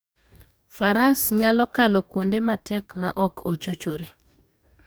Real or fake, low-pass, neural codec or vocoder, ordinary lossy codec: fake; none; codec, 44.1 kHz, 2.6 kbps, DAC; none